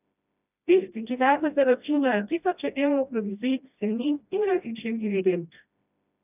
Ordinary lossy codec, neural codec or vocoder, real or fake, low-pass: none; codec, 16 kHz, 1 kbps, FreqCodec, smaller model; fake; 3.6 kHz